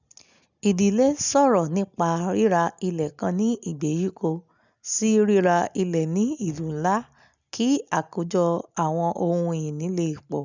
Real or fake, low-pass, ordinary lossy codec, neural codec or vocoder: real; 7.2 kHz; none; none